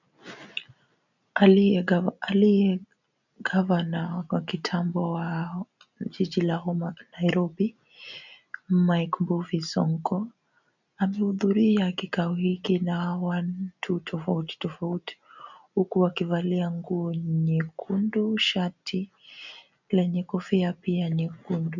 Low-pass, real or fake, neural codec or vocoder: 7.2 kHz; real; none